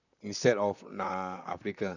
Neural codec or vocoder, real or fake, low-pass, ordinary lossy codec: vocoder, 44.1 kHz, 128 mel bands, Pupu-Vocoder; fake; 7.2 kHz; none